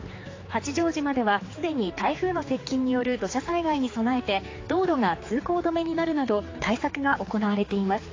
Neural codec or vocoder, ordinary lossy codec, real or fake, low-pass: codec, 16 kHz, 4 kbps, X-Codec, HuBERT features, trained on general audio; AAC, 32 kbps; fake; 7.2 kHz